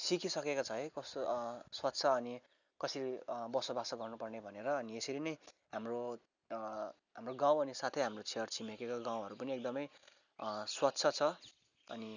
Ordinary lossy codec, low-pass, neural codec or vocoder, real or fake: none; 7.2 kHz; none; real